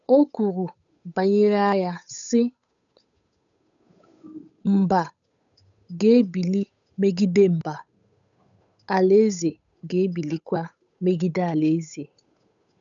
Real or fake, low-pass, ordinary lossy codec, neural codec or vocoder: fake; 7.2 kHz; none; codec, 16 kHz, 8 kbps, FunCodec, trained on Chinese and English, 25 frames a second